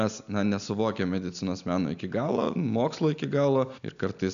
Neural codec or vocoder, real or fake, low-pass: none; real; 7.2 kHz